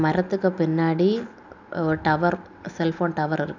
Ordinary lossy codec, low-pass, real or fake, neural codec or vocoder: none; 7.2 kHz; real; none